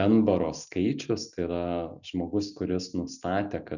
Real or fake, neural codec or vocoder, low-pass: real; none; 7.2 kHz